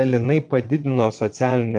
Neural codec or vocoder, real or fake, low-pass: vocoder, 22.05 kHz, 80 mel bands, WaveNeXt; fake; 9.9 kHz